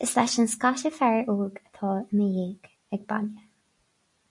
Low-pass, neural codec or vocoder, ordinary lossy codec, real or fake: 10.8 kHz; none; MP3, 48 kbps; real